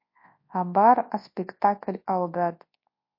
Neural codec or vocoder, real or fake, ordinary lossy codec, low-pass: codec, 24 kHz, 0.9 kbps, WavTokenizer, large speech release; fake; MP3, 32 kbps; 5.4 kHz